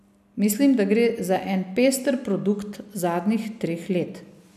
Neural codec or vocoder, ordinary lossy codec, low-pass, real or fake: none; none; 14.4 kHz; real